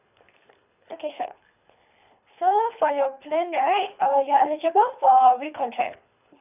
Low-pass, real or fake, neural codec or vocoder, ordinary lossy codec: 3.6 kHz; fake; codec, 24 kHz, 3 kbps, HILCodec; none